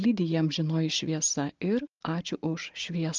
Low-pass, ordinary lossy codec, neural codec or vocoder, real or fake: 7.2 kHz; Opus, 32 kbps; none; real